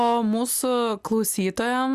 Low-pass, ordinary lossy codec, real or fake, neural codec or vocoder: 14.4 kHz; Opus, 64 kbps; real; none